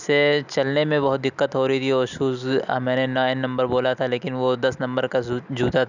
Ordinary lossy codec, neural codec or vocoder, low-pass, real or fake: none; none; 7.2 kHz; real